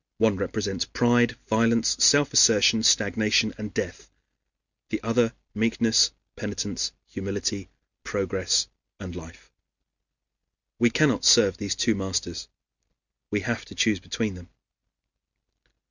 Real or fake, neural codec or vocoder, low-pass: real; none; 7.2 kHz